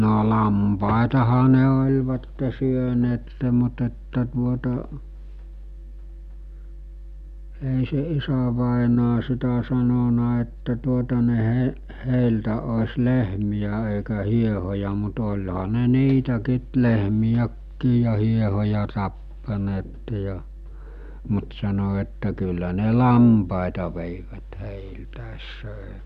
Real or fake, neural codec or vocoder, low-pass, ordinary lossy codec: real; none; 14.4 kHz; none